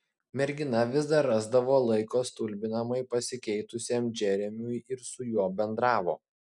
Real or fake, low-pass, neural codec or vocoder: real; 10.8 kHz; none